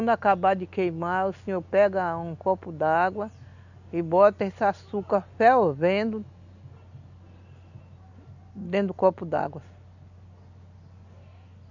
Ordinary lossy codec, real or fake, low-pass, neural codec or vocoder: none; real; 7.2 kHz; none